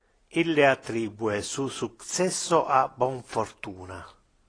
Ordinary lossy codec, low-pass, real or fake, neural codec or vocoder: AAC, 32 kbps; 9.9 kHz; real; none